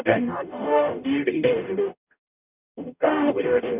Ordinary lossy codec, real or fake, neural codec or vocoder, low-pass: none; fake; codec, 44.1 kHz, 0.9 kbps, DAC; 3.6 kHz